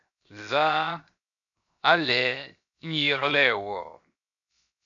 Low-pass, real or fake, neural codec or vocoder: 7.2 kHz; fake; codec, 16 kHz, 0.7 kbps, FocalCodec